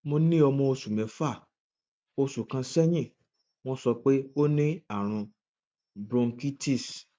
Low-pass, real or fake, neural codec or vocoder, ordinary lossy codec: none; real; none; none